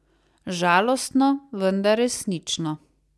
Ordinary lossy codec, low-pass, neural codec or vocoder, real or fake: none; none; none; real